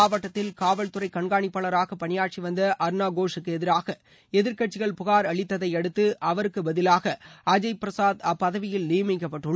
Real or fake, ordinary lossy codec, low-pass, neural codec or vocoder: real; none; none; none